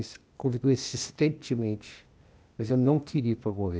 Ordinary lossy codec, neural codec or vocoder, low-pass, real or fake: none; codec, 16 kHz, 0.8 kbps, ZipCodec; none; fake